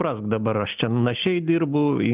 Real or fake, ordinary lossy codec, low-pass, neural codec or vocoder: real; Opus, 32 kbps; 3.6 kHz; none